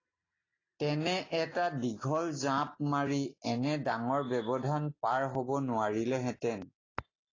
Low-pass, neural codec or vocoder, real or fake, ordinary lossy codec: 7.2 kHz; none; real; AAC, 32 kbps